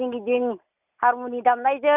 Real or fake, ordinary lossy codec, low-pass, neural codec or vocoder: real; none; 3.6 kHz; none